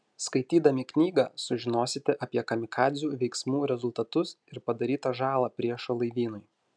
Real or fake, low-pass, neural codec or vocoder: real; 9.9 kHz; none